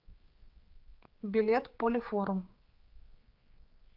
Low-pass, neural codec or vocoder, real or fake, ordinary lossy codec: 5.4 kHz; codec, 16 kHz, 4 kbps, X-Codec, HuBERT features, trained on general audio; fake; Opus, 32 kbps